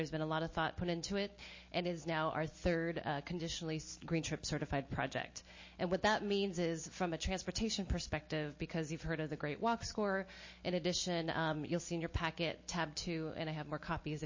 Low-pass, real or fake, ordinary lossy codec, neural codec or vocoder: 7.2 kHz; real; MP3, 32 kbps; none